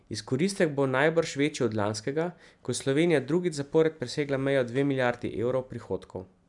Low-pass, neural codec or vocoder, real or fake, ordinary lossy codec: 10.8 kHz; none; real; none